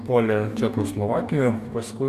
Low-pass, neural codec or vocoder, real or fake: 14.4 kHz; codec, 44.1 kHz, 2.6 kbps, DAC; fake